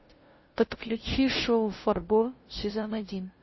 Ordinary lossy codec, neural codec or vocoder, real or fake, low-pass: MP3, 24 kbps; codec, 16 kHz, 0.5 kbps, FunCodec, trained on LibriTTS, 25 frames a second; fake; 7.2 kHz